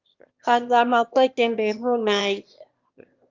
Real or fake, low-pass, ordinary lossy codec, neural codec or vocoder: fake; 7.2 kHz; Opus, 32 kbps; autoencoder, 22.05 kHz, a latent of 192 numbers a frame, VITS, trained on one speaker